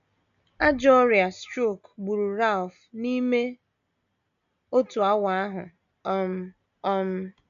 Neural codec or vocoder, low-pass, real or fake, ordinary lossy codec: none; 7.2 kHz; real; none